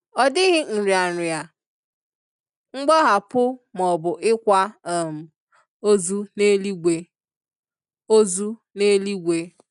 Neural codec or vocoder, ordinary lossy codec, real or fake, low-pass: none; none; real; 10.8 kHz